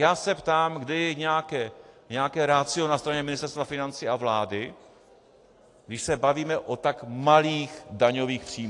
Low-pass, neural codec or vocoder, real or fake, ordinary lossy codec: 10.8 kHz; none; real; AAC, 48 kbps